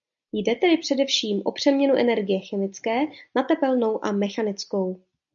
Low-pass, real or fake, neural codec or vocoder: 7.2 kHz; real; none